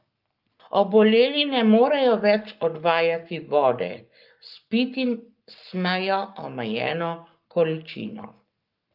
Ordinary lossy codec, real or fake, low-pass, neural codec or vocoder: Opus, 24 kbps; fake; 5.4 kHz; codec, 44.1 kHz, 7.8 kbps, Pupu-Codec